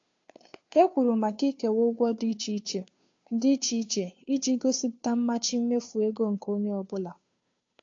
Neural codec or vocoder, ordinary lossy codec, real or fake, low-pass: codec, 16 kHz, 2 kbps, FunCodec, trained on Chinese and English, 25 frames a second; AAC, 48 kbps; fake; 7.2 kHz